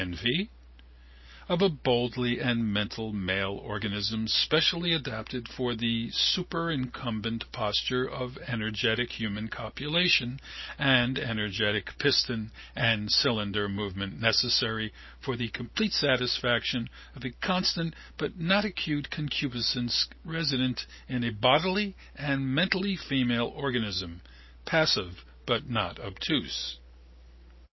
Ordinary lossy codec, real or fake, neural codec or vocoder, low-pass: MP3, 24 kbps; real; none; 7.2 kHz